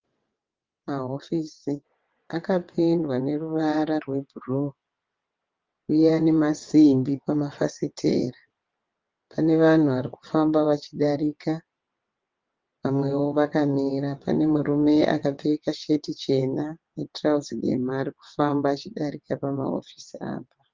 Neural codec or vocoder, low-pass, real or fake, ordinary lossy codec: vocoder, 22.05 kHz, 80 mel bands, WaveNeXt; 7.2 kHz; fake; Opus, 24 kbps